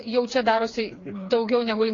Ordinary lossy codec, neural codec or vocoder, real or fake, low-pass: AAC, 32 kbps; codec, 16 kHz, 4 kbps, FreqCodec, smaller model; fake; 7.2 kHz